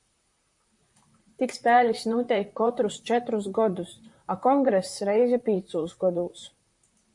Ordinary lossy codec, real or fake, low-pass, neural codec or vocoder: MP3, 64 kbps; fake; 10.8 kHz; vocoder, 44.1 kHz, 128 mel bands, Pupu-Vocoder